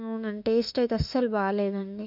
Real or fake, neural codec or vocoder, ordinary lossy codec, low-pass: fake; autoencoder, 48 kHz, 32 numbers a frame, DAC-VAE, trained on Japanese speech; none; 5.4 kHz